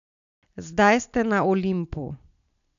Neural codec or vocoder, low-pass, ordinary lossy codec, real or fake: none; 7.2 kHz; none; real